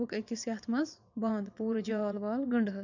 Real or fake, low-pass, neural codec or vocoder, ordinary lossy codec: fake; 7.2 kHz; vocoder, 22.05 kHz, 80 mel bands, WaveNeXt; none